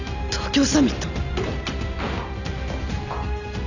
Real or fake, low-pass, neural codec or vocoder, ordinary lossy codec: real; 7.2 kHz; none; none